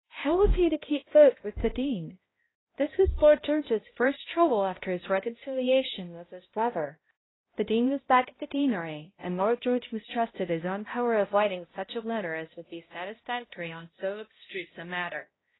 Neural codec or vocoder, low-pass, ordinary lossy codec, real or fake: codec, 16 kHz, 0.5 kbps, X-Codec, HuBERT features, trained on balanced general audio; 7.2 kHz; AAC, 16 kbps; fake